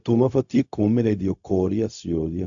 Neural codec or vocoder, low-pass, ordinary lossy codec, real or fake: codec, 16 kHz, 0.4 kbps, LongCat-Audio-Codec; 7.2 kHz; AAC, 64 kbps; fake